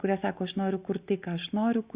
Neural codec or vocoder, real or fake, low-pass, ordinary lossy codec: none; real; 3.6 kHz; Opus, 64 kbps